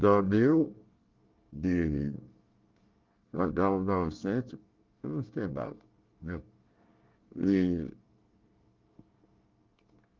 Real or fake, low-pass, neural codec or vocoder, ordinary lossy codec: fake; 7.2 kHz; codec, 24 kHz, 1 kbps, SNAC; Opus, 32 kbps